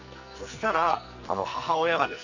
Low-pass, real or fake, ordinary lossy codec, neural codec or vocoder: 7.2 kHz; fake; none; codec, 44.1 kHz, 2.6 kbps, SNAC